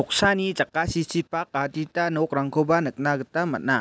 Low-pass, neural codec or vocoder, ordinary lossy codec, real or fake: none; none; none; real